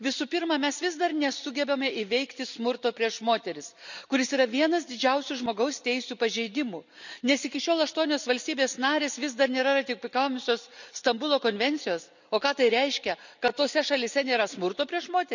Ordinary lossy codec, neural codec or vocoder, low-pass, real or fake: none; none; 7.2 kHz; real